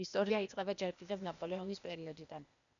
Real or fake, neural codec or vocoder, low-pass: fake; codec, 16 kHz, 0.8 kbps, ZipCodec; 7.2 kHz